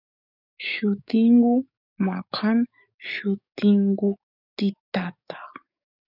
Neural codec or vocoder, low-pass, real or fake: codec, 44.1 kHz, 7.8 kbps, DAC; 5.4 kHz; fake